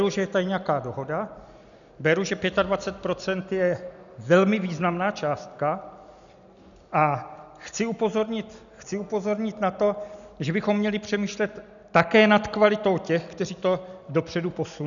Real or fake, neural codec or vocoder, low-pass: real; none; 7.2 kHz